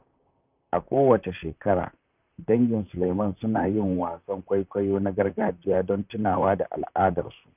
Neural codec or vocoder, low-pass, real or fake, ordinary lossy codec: vocoder, 44.1 kHz, 128 mel bands, Pupu-Vocoder; 3.6 kHz; fake; none